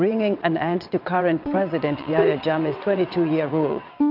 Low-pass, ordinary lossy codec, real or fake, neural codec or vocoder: 5.4 kHz; AAC, 48 kbps; fake; vocoder, 22.05 kHz, 80 mel bands, WaveNeXt